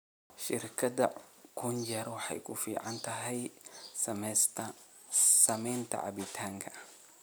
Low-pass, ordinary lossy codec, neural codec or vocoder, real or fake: none; none; none; real